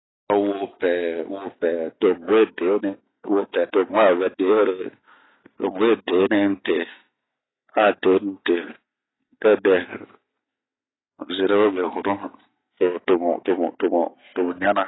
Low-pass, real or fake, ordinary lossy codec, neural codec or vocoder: 7.2 kHz; real; AAC, 16 kbps; none